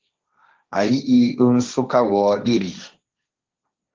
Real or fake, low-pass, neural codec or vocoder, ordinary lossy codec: fake; 7.2 kHz; codec, 16 kHz, 1.1 kbps, Voila-Tokenizer; Opus, 32 kbps